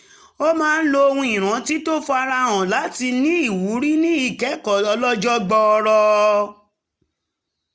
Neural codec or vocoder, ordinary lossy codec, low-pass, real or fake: none; none; none; real